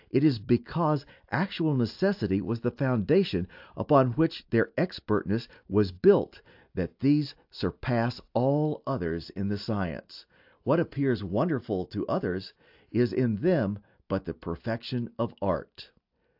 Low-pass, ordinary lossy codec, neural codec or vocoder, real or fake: 5.4 kHz; AAC, 48 kbps; none; real